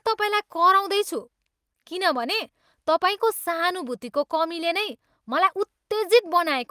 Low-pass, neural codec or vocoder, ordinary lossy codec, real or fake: 14.4 kHz; none; Opus, 32 kbps; real